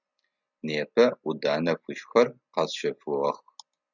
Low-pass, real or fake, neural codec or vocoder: 7.2 kHz; real; none